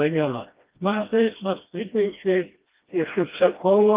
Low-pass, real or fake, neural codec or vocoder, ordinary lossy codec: 3.6 kHz; fake; codec, 16 kHz, 1 kbps, FreqCodec, smaller model; Opus, 32 kbps